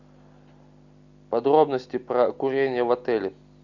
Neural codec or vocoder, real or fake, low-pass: none; real; 7.2 kHz